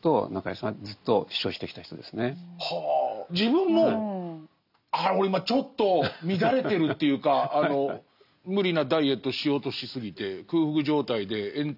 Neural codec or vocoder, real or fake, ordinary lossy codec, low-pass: none; real; none; 5.4 kHz